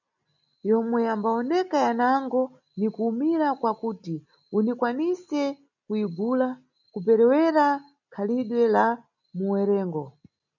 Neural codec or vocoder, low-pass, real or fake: none; 7.2 kHz; real